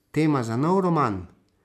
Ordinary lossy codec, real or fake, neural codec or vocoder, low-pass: none; real; none; 14.4 kHz